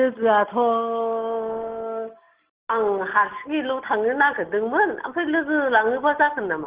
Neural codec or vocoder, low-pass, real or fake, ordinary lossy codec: none; 3.6 kHz; real; Opus, 16 kbps